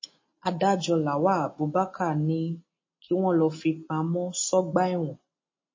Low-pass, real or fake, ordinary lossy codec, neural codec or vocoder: 7.2 kHz; real; MP3, 32 kbps; none